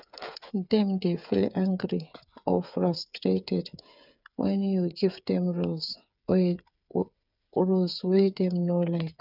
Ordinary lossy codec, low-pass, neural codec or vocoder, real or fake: none; 5.4 kHz; codec, 16 kHz, 8 kbps, FreqCodec, smaller model; fake